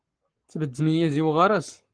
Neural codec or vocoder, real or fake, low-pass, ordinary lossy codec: codec, 44.1 kHz, 7.8 kbps, Pupu-Codec; fake; 9.9 kHz; Opus, 24 kbps